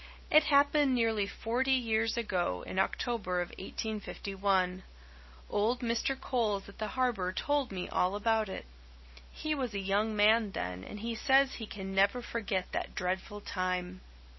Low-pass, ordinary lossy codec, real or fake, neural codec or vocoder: 7.2 kHz; MP3, 24 kbps; real; none